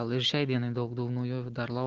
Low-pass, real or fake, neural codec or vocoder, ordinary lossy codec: 7.2 kHz; real; none; Opus, 16 kbps